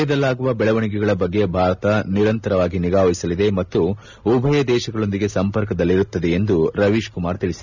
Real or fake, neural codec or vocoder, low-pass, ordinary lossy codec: real; none; 7.2 kHz; none